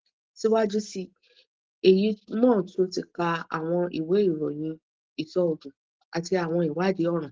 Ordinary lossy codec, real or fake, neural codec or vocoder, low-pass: Opus, 16 kbps; real; none; 7.2 kHz